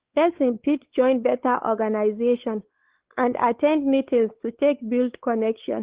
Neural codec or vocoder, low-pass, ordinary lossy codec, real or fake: codec, 16 kHz, 4 kbps, X-Codec, WavLM features, trained on Multilingual LibriSpeech; 3.6 kHz; Opus, 16 kbps; fake